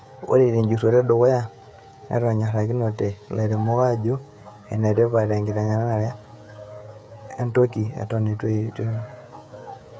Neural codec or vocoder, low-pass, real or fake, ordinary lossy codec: codec, 16 kHz, 16 kbps, FreqCodec, smaller model; none; fake; none